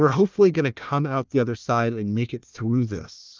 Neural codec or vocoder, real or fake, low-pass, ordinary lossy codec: codec, 16 kHz, 1 kbps, FunCodec, trained on Chinese and English, 50 frames a second; fake; 7.2 kHz; Opus, 24 kbps